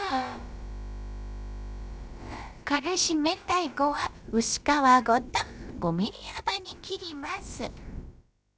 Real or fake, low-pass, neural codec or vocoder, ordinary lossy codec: fake; none; codec, 16 kHz, about 1 kbps, DyCAST, with the encoder's durations; none